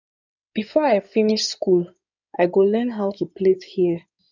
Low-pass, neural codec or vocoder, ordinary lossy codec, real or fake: 7.2 kHz; codec, 16 kHz in and 24 kHz out, 2.2 kbps, FireRedTTS-2 codec; none; fake